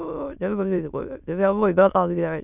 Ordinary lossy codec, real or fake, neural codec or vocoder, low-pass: none; fake; autoencoder, 22.05 kHz, a latent of 192 numbers a frame, VITS, trained on many speakers; 3.6 kHz